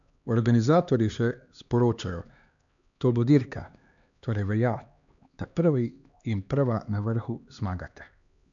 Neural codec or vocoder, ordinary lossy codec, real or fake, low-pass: codec, 16 kHz, 2 kbps, X-Codec, HuBERT features, trained on LibriSpeech; none; fake; 7.2 kHz